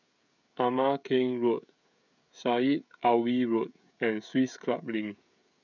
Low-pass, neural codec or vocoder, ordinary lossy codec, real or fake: 7.2 kHz; codec, 16 kHz, 16 kbps, FreqCodec, smaller model; none; fake